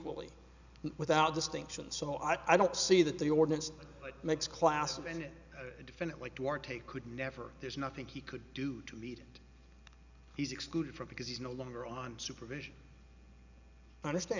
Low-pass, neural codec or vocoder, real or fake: 7.2 kHz; none; real